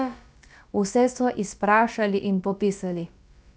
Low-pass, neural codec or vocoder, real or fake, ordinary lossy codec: none; codec, 16 kHz, about 1 kbps, DyCAST, with the encoder's durations; fake; none